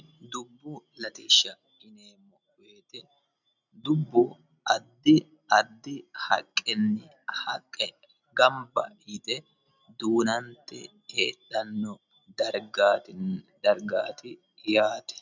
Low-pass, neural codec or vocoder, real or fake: 7.2 kHz; none; real